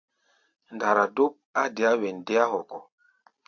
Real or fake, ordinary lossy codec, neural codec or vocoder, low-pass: real; AAC, 48 kbps; none; 7.2 kHz